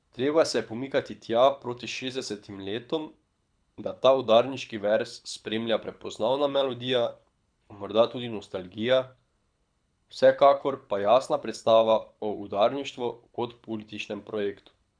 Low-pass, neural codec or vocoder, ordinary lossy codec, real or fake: 9.9 kHz; codec, 24 kHz, 6 kbps, HILCodec; none; fake